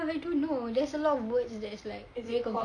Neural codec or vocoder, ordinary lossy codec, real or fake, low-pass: none; none; real; 9.9 kHz